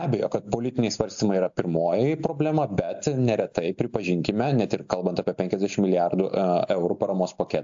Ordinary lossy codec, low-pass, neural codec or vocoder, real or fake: AAC, 64 kbps; 7.2 kHz; none; real